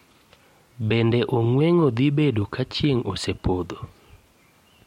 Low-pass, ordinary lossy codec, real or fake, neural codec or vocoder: 19.8 kHz; MP3, 64 kbps; real; none